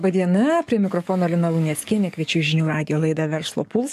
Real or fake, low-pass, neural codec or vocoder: fake; 14.4 kHz; codec, 44.1 kHz, 7.8 kbps, DAC